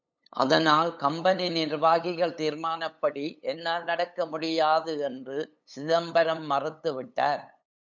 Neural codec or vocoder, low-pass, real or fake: codec, 16 kHz, 8 kbps, FunCodec, trained on LibriTTS, 25 frames a second; 7.2 kHz; fake